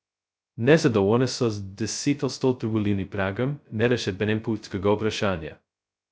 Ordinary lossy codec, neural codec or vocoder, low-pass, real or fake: none; codec, 16 kHz, 0.2 kbps, FocalCodec; none; fake